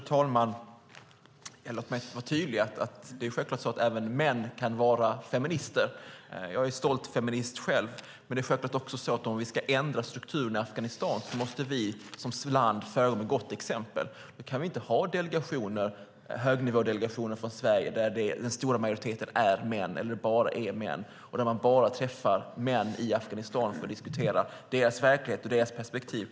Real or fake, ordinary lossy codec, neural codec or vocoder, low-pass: real; none; none; none